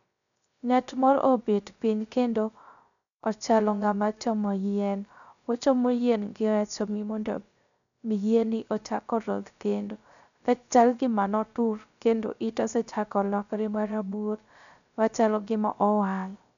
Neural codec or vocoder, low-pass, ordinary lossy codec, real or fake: codec, 16 kHz, 0.3 kbps, FocalCodec; 7.2 kHz; none; fake